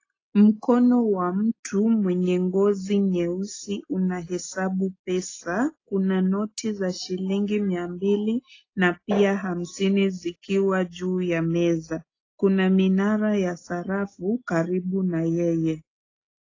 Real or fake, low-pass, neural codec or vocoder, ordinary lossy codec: real; 7.2 kHz; none; AAC, 32 kbps